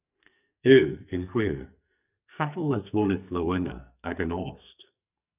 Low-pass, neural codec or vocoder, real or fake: 3.6 kHz; codec, 44.1 kHz, 2.6 kbps, SNAC; fake